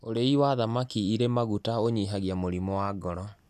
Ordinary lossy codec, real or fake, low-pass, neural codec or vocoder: none; real; 14.4 kHz; none